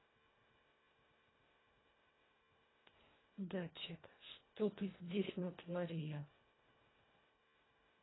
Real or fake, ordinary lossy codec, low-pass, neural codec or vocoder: fake; AAC, 16 kbps; 7.2 kHz; codec, 24 kHz, 1.5 kbps, HILCodec